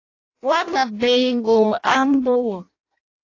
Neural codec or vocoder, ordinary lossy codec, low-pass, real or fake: codec, 16 kHz in and 24 kHz out, 0.6 kbps, FireRedTTS-2 codec; AAC, 32 kbps; 7.2 kHz; fake